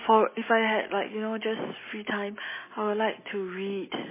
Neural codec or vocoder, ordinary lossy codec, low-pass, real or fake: none; MP3, 16 kbps; 3.6 kHz; real